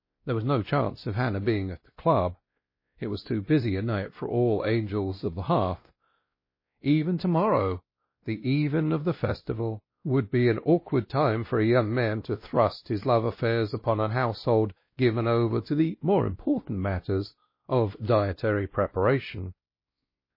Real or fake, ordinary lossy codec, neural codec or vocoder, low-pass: fake; MP3, 24 kbps; codec, 16 kHz, 1 kbps, X-Codec, WavLM features, trained on Multilingual LibriSpeech; 5.4 kHz